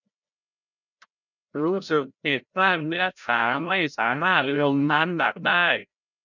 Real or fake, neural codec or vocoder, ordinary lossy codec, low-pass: fake; codec, 16 kHz, 0.5 kbps, FreqCodec, larger model; none; 7.2 kHz